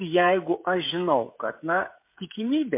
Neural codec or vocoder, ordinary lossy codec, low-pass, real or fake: codec, 16 kHz, 16 kbps, FreqCodec, smaller model; MP3, 24 kbps; 3.6 kHz; fake